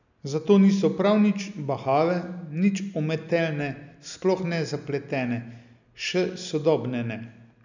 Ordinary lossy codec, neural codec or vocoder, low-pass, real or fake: none; none; 7.2 kHz; real